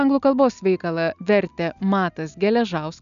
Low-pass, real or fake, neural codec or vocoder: 7.2 kHz; real; none